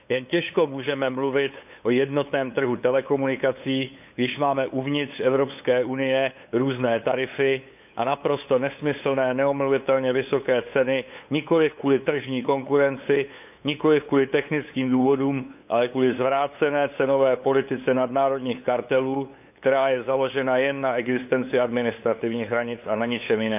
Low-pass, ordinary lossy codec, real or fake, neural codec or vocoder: 3.6 kHz; none; fake; codec, 16 kHz, 8 kbps, FunCodec, trained on LibriTTS, 25 frames a second